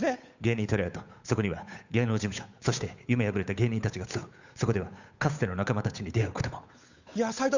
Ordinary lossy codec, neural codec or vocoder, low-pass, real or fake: Opus, 64 kbps; codec, 16 kHz, 8 kbps, FunCodec, trained on Chinese and English, 25 frames a second; 7.2 kHz; fake